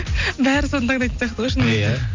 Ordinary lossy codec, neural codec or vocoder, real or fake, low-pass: MP3, 64 kbps; none; real; 7.2 kHz